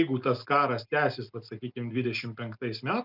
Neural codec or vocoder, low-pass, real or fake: none; 5.4 kHz; real